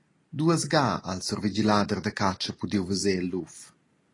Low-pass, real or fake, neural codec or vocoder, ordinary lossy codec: 10.8 kHz; real; none; AAC, 32 kbps